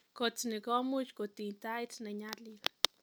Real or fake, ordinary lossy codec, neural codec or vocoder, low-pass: real; none; none; none